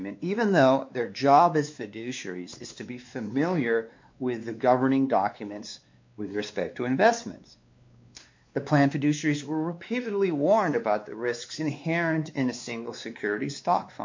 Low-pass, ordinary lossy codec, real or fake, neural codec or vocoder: 7.2 kHz; MP3, 48 kbps; fake; codec, 16 kHz, 2 kbps, X-Codec, WavLM features, trained on Multilingual LibriSpeech